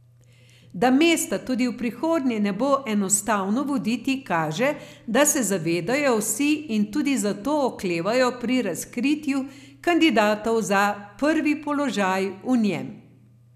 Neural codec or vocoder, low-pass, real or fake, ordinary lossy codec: none; 14.4 kHz; real; none